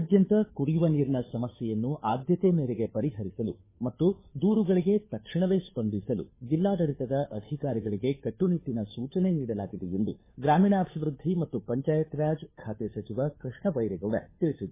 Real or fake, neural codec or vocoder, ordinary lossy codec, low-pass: fake; codec, 16 kHz, 2 kbps, FunCodec, trained on Chinese and English, 25 frames a second; MP3, 16 kbps; 3.6 kHz